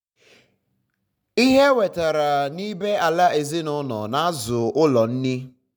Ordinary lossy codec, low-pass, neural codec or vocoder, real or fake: none; 19.8 kHz; none; real